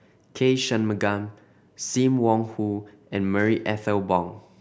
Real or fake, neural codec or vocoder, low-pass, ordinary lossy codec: real; none; none; none